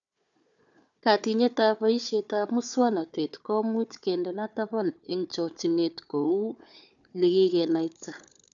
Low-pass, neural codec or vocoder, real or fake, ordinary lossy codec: 7.2 kHz; codec, 16 kHz, 4 kbps, FunCodec, trained on Chinese and English, 50 frames a second; fake; none